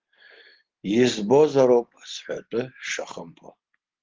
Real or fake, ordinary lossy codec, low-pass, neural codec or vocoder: real; Opus, 16 kbps; 7.2 kHz; none